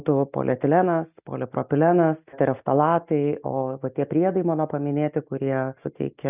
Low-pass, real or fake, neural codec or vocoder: 3.6 kHz; real; none